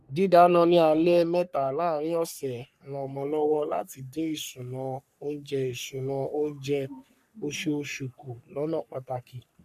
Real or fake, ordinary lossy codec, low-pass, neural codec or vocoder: fake; none; 14.4 kHz; codec, 44.1 kHz, 3.4 kbps, Pupu-Codec